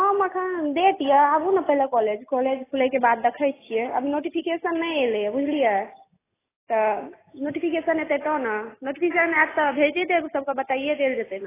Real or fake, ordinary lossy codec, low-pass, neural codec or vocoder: real; AAC, 16 kbps; 3.6 kHz; none